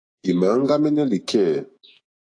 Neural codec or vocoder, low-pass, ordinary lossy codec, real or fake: autoencoder, 48 kHz, 128 numbers a frame, DAC-VAE, trained on Japanese speech; 9.9 kHz; AAC, 48 kbps; fake